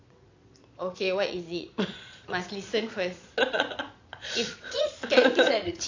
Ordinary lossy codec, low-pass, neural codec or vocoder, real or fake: AAC, 48 kbps; 7.2 kHz; vocoder, 22.05 kHz, 80 mel bands, Vocos; fake